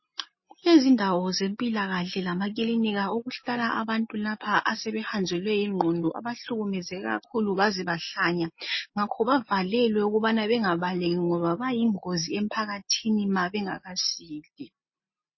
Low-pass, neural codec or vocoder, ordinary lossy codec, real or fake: 7.2 kHz; none; MP3, 24 kbps; real